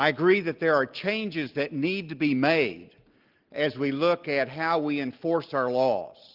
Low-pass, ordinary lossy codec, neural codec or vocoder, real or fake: 5.4 kHz; Opus, 32 kbps; none; real